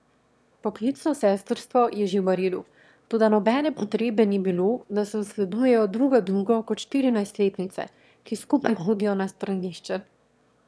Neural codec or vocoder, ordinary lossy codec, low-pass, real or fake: autoencoder, 22.05 kHz, a latent of 192 numbers a frame, VITS, trained on one speaker; none; none; fake